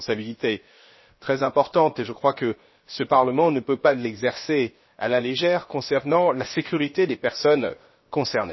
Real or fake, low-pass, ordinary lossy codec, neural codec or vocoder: fake; 7.2 kHz; MP3, 24 kbps; codec, 16 kHz, 0.7 kbps, FocalCodec